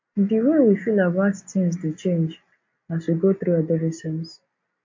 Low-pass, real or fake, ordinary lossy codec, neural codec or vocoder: 7.2 kHz; real; MP3, 48 kbps; none